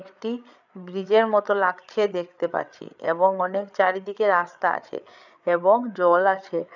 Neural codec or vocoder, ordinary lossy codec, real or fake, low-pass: codec, 16 kHz, 8 kbps, FreqCodec, larger model; none; fake; 7.2 kHz